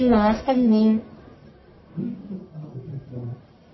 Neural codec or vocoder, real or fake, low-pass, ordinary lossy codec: codec, 44.1 kHz, 1.7 kbps, Pupu-Codec; fake; 7.2 kHz; MP3, 24 kbps